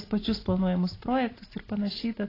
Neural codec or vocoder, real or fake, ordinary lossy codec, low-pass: none; real; AAC, 24 kbps; 5.4 kHz